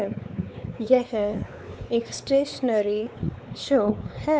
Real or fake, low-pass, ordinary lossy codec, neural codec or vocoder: fake; none; none; codec, 16 kHz, 4 kbps, X-Codec, WavLM features, trained on Multilingual LibriSpeech